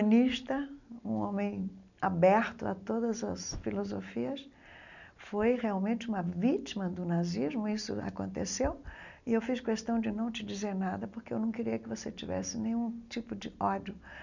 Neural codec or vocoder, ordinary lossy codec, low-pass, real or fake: none; none; 7.2 kHz; real